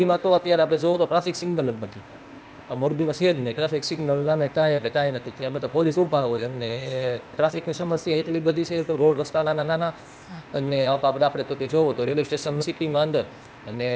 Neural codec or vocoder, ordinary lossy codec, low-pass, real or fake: codec, 16 kHz, 0.8 kbps, ZipCodec; none; none; fake